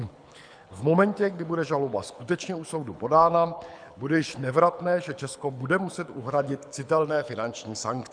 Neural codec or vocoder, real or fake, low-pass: codec, 24 kHz, 6 kbps, HILCodec; fake; 9.9 kHz